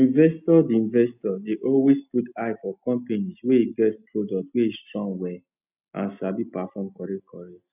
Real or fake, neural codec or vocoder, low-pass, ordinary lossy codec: real; none; 3.6 kHz; none